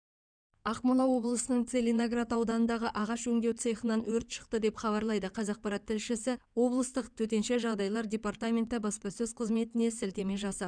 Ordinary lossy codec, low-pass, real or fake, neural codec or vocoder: none; 9.9 kHz; fake; codec, 16 kHz in and 24 kHz out, 2.2 kbps, FireRedTTS-2 codec